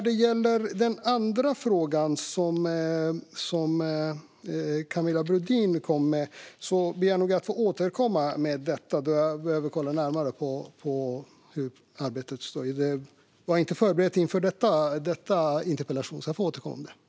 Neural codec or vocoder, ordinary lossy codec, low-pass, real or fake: none; none; none; real